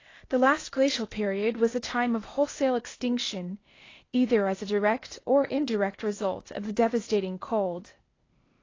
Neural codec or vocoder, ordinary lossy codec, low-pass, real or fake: codec, 16 kHz, 0.8 kbps, ZipCodec; AAC, 32 kbps; 7.2 kHz; fake